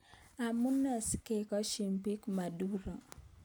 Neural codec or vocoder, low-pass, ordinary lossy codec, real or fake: none; none; none; real